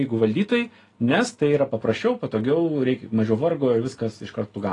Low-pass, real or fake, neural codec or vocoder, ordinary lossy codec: 10.8 kHz; real; none; AAC, 32 kbps